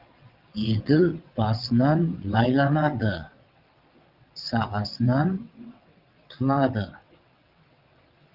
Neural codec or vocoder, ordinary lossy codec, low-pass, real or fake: vocoder, 22.05 kHz, 80 mel bands, WaveNeXt; Opus, 32 kbps; 5.4 kHz; fake